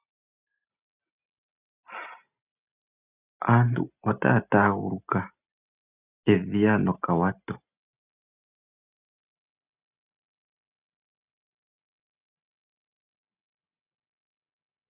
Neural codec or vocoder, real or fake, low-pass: none; real; 3.6 kHz